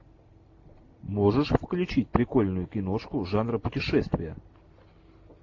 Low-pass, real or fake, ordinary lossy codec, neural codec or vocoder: 7.2 kHz; real; AAC, 48 kbps; none